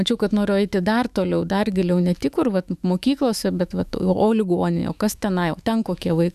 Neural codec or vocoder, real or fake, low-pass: autoencoder, 48 kHz, 128 numbers a frame, DAC-VAE, trained on Japanese speech; fake; 14.4 kHz